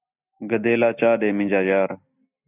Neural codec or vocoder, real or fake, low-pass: none; real; 3.6 kHz